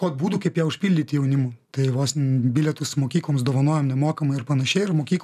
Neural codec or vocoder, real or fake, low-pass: none; real; 14.4 kHz